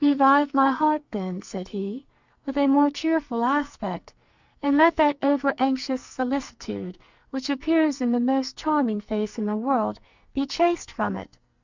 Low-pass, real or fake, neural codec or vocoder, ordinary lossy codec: 7.2 kHz; fake; codec, 44.1 kHz, 2.6 kbps, SNAC; Opus, 64 kbps